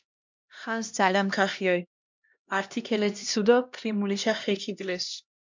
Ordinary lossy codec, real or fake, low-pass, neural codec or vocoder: MP3, 64 kbps; fake; 7.2 kHz; codec, 16 kHz, 1 kbps, X-Codec, HuBERT features, trained on LibriSpeech